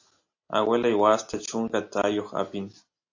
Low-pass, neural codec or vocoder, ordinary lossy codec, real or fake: 7.2 kHz; none; AAC, 48 kbps; real